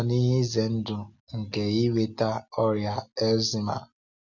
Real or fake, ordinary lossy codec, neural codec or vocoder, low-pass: real; none; none; 7.2 kHz